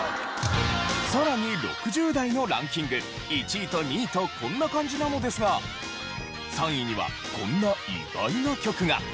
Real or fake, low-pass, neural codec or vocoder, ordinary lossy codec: real; none; none; none